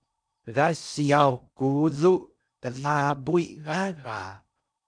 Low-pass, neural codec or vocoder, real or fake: 9.9 kHz; codec, 16 kHz in and 24 kHz out, 0.6 kbps, FocalCodec, streaming, 4096 codes; fake